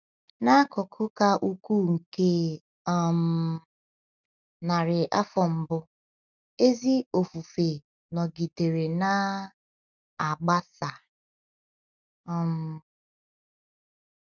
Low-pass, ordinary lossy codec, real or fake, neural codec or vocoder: 7.2 kHz; none; real; none